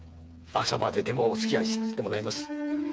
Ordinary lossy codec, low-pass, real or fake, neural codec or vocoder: none; none; fake; codec, 16 kHz, 4 kbps, FreqCodec, smaller model